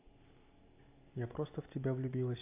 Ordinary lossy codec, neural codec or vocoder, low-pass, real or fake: AAC, 32 kbps; none; 3.6 kHz; real